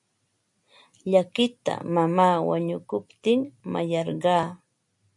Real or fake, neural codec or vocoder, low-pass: real; none; 10.8 kHz